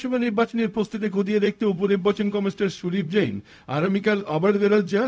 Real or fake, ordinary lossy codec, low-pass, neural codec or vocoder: fake; none; none; codec, 16 kHz, 0.4 kbps, LongCat-Audio-Codec